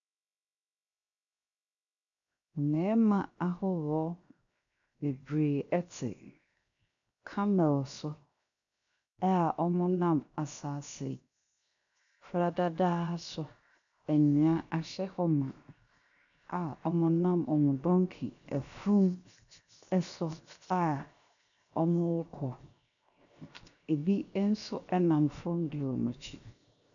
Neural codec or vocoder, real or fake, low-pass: codec, 16 kHz, 0.7 kbps, FocalCodec; fake; 7.2 kHz